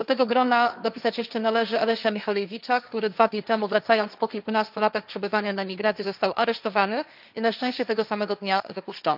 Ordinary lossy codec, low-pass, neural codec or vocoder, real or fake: none; 5.4 kHz; codec, 16 kHz, 1.1 kbps, Voila-Tokenizer; fake